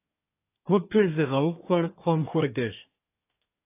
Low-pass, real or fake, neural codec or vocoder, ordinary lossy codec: 3.6 kHz; fake; codec, 24 kHz, 1 kbps, SNAC; AAC, 24 kbps